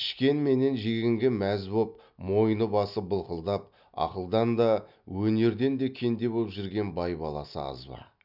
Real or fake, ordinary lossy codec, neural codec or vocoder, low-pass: real; none; none; 5.4 kHz